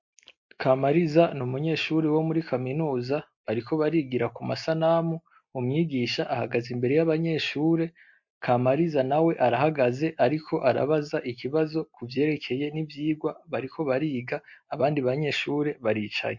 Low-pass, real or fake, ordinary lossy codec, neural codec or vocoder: 7.2 kHz; real; MP3, 48 kbps; none